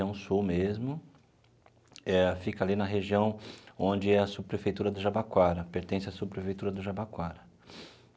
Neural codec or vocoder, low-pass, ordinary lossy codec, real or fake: none; none; none; real